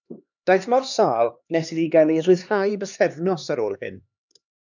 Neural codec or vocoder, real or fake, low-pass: codec, 16 kHz, 2 kbps, X-Codec, HuBERT features, trained on LibriSpeech; fake; 7.2 kHz